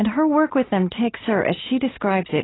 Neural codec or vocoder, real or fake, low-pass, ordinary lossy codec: none; real; 7.2 kHz; AAC, 16 kbps